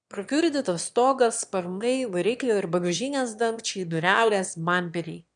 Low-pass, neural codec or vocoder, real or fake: 9.9 kHz; autoencoder, 22.05 kHz, a latent of 192 numbers a frame, VITS, trained on one speaker; fake